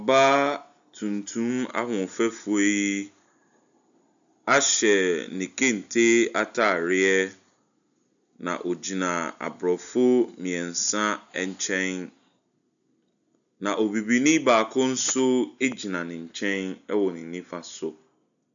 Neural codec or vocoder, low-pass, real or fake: none; 7.2 kHz; real